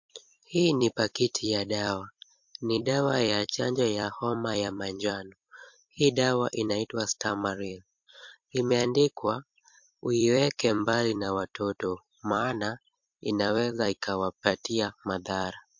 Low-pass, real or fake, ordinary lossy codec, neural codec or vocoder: 7.2 kHz; fake; MP3, 48 kbps; vocoder, 44.1 kHz, 128 mel bands every 256 samples, BigVGAN v2